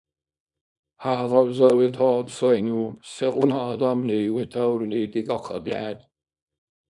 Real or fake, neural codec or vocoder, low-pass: fake; codec, 24 kHz, 0.9 kbps, WavTokenizer, small release; 10.8 kHz